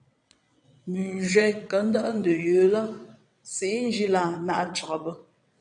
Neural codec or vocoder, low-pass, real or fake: vocoder, 22.05 kHz, 80 mel bands, WaveNeXt; 9.9 kHz; fake